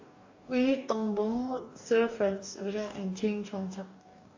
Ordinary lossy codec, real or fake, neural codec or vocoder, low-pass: AAC, 48 kbps; fake; codec, 44.1 kHz, 2.6 kbps, DAC; 7.2 kHz